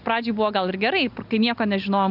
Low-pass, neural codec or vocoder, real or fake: 5.4 kHz; none; real